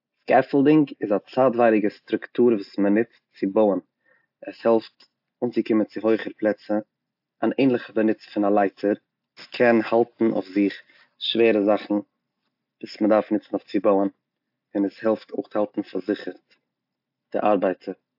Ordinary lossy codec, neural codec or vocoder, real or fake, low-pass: AAC, 48 kbps; none; real; 5.4 kHz